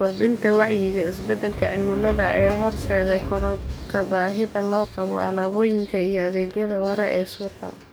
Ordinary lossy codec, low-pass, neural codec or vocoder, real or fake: none; none; codec, 44.1 kHz, 2.6 kbps, DAC; fake